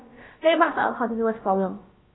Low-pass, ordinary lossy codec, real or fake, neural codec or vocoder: 7.2 kHz; AAC, 16 kbps; fake; codec, 16 kHz, about 1 kbps, DyCAST, with the encoder's durations